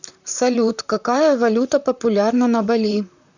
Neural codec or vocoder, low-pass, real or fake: vocoder, 44.1 kHz, 128 mel bands, Pupu-Vocoder; 7.2 kHz; fake